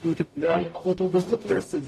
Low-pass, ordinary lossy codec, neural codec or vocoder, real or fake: 14.4 kHz; AAC, 48 kbps; codec, 44.1 kHz, 0.9 kbps, DAC; fake